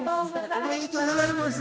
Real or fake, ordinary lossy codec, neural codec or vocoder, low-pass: fake; none; codec, 16 kHz, 1 kbps, X-Codec, HuBERT features, trained on general audio; none